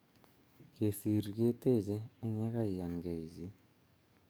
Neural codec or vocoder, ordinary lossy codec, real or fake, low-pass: codec, 44.1 kHz, 7.8 kbps, DAC; none; fake; none